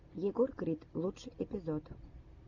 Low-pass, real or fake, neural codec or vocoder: 7.2 kHz; real; none